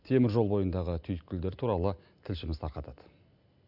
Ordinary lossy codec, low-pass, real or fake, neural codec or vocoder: none; 5.4 kHz; real; none